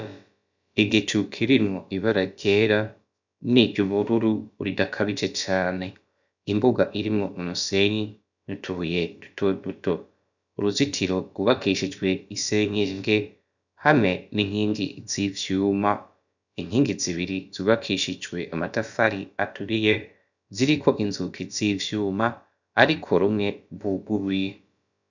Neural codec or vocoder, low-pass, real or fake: codec, 16 kHz, about 1 kbps, DyCAST, with the encoder's durations; 7.2 kHz; fake